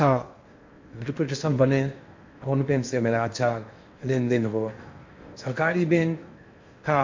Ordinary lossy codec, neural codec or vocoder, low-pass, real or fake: MP3, 48 kbps; codec, 16 kHz in and 24 kHz out, 0.8 kbps, FocalCodec, streaming, 65536 codes; 7.2 kHz; fake